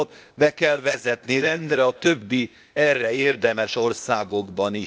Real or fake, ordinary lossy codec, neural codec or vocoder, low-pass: fake; none; codec, 16 kHz, 0.8 kbps, ZipCodec; none